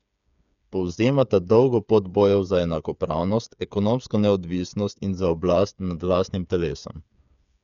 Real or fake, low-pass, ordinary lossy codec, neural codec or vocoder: fake; 7.2 kHz; none; codec, 16 kHz, 8 kbps, FreqCodec, smaller model